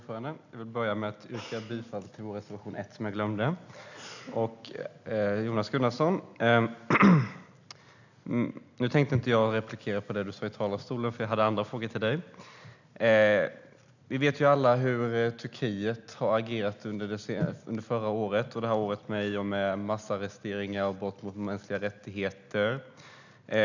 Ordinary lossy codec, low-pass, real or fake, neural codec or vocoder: none; 7.2 kHz; real; none